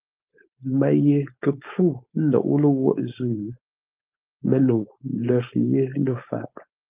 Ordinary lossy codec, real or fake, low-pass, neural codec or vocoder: Opus, 32 kbps; fake; 3.6 kHz; codec, 16 kHz, 4.8 kbps, FACodec